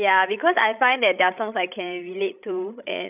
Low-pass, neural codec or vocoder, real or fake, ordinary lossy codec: 3.6 kHz; codec, 16 kHz, 16 kbps, FreqCodec, larger model; fake; none